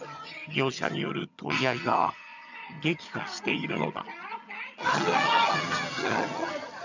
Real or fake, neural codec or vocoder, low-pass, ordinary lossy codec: fake; vocoder, 22.05 kHz, 80 mel bands, HiFi-GAN; 7.2 kHz; none